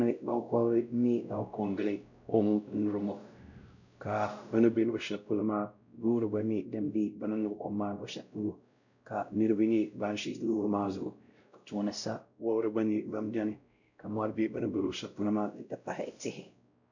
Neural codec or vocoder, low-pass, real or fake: codec, 16 kHz, 0.5 kbps, X-Codec, WavLM features, trained on Multilingual LibriSpeech; 7.2 kHz; fake